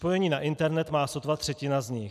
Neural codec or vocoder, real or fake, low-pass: none; real; 14.4 kHz